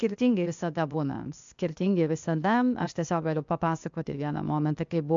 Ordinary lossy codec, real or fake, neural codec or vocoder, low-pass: MP3, 64 kbps; fake; codec, 16 kHz, 0.8 kbps, ZipCodec; 7.2 kHz